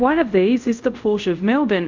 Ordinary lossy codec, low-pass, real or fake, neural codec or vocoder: MP3, 64 kbps; 7.2 kHz; fake; codec, 24 kHz, 0.5 kbps, DualCodec